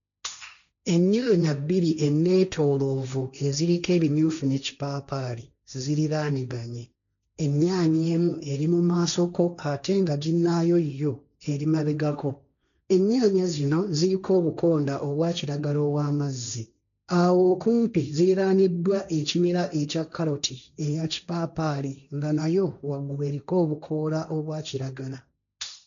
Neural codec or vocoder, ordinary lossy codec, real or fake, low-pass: codec, 16 kHz, 1.1 kbps, Voila-Tokenizer; MP3, 96 kbps; fake; 7.2 kHz